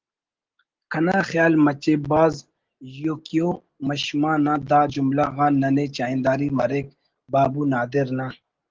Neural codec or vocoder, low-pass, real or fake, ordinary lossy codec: none; 7.2 kHz; real; Opus, 16 kbps